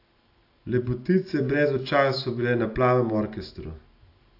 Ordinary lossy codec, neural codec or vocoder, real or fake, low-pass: AAC, 32 kbps; none; real; 5.4 kHz